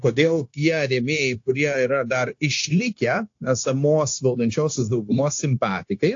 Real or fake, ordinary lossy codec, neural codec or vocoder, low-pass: fake; AAC, 48 kbps; codec, 16 kHz, 0.9 kbps, LongCat-Audio-Codec; 7.2 kHz